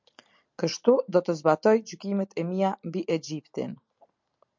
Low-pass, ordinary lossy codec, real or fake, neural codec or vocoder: 7.2 kHz; MP3, 64 kbps; real; none